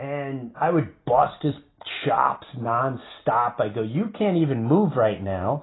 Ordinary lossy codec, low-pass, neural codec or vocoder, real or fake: AAC, 16 kbps; 7.2 kHz; none; real